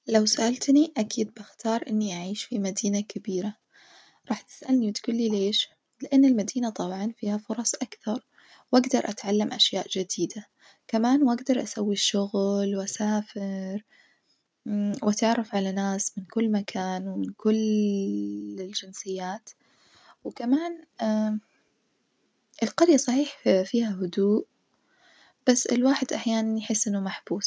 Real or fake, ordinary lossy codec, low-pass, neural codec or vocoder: real; none; none; none